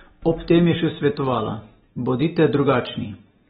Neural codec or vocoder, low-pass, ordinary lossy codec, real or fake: none; 7.2 kHz; AAC, 16 kbps; real